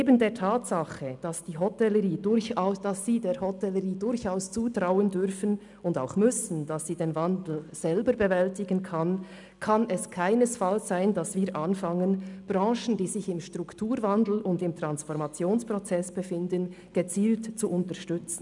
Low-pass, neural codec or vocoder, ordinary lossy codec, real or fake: 10.8 kHz; none; none; real